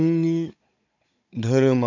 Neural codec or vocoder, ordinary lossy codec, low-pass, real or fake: codec, 16 kHz, 16 kbps, FunCodec, trained on Chinese and English, 50 frames a second; none; 7.2 kHz; fake